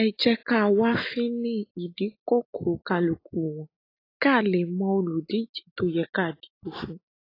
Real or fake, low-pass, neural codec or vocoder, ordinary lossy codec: real; 5.4 kHz; none; AAC, 24 kbps